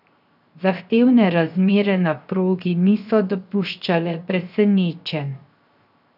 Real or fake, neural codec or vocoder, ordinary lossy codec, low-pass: fake; codec, 16 kHz, 0.7 kbps, FocalCodec; none; 5.4 kHz